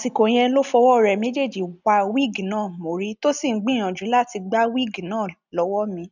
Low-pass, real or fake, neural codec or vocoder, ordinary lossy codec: 7.2 kHz; real; none; none